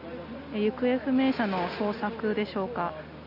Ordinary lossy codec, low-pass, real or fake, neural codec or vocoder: none; 5.4 kHz; real; none